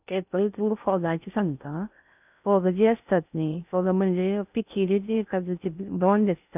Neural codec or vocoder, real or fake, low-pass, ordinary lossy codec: codec, 16 kHz in and 24 kHz out, 0.6 kbps, FocalCodec, streaming, 4096 codes; fake; 3.6 kHz; none